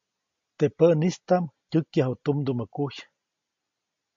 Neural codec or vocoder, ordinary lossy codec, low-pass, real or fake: none; MP3, 48 kbps; 7.2 kHz; real